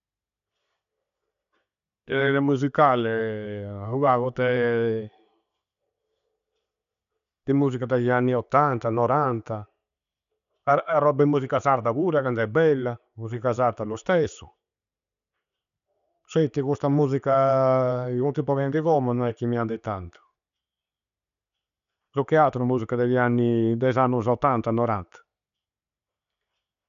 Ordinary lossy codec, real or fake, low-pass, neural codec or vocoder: none; real; 7.2 kHz; none